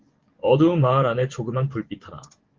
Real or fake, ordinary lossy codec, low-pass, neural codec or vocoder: fake; Opus, 16 kbps; 7.2 kHz; vocoder, 24 kHz, 100 mel bands, Vocos